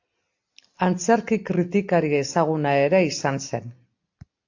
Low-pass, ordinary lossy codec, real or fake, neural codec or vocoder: 7.2 kHz; AAC, 48 kbps; real; none